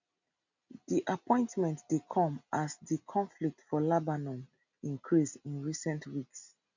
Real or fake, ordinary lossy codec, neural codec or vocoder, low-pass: real; none; none; 7.2 kHz